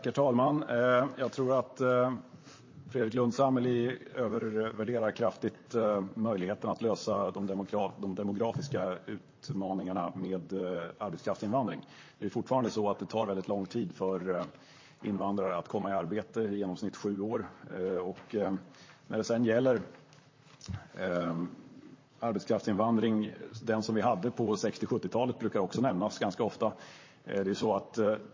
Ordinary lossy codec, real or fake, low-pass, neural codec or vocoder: MP3, 32 kbps; fake; 7.2 kHz; vocoder, 44.1 kHz, 128 mel bands, Pupu-Vocoder